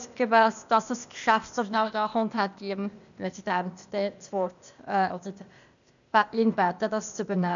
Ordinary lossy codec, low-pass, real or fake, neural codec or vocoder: none; 7.2 kHz; fake; codec, 16 kHz, 0.8 kbps, ZipCodec